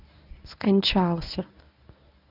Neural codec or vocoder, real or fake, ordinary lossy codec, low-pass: codec, 24 kHz, 0.9 kbps, WavTokenizer, medium speech release version 1; fake; none; 5.4 kHz